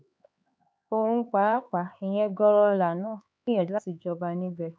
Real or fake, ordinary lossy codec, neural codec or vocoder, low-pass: fake; none; codec, 16 kHz, 4 kbps, X-Codec, HuBERT features, trained on LibriSpeech; none